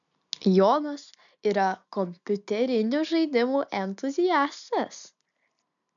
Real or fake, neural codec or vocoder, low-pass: real; none; 7.2 kHz